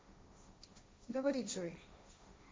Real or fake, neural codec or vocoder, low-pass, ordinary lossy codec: fake; codec, 16 kHz, 1.1 kbps, Voila-Tokenizer; none; none